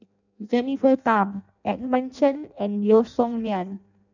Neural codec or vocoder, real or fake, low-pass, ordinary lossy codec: codec, 16 kHz in and 24 kHz out, 0.6 kbps, FireRedTTS-2 codec; fake; 7.2 kHz; none